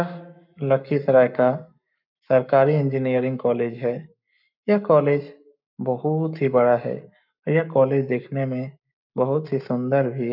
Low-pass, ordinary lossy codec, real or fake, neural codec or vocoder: 5.4 kHz; none; fake; codec, 44.1 kHz, 7.8 kbps, Pupu-Codec